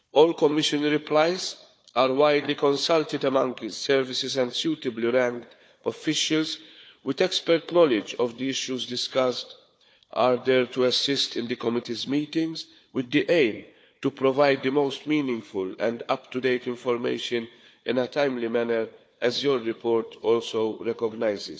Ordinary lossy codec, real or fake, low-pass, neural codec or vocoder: none; fake; none; codec, 16 kHz, 4 kbps, FunCodec, trained on Chinese and English, 50 frames a second